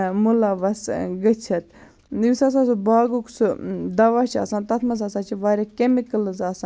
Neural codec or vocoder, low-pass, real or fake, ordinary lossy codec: none; none; real; none